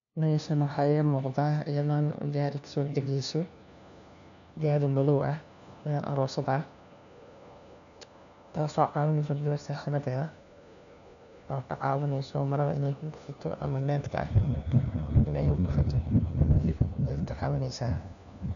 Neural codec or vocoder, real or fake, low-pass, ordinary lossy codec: codec, 16 kHz, 1 kbps, FunCodec, trained on LibriTTS, 50 frames a second; fake; 7.2 kHz; none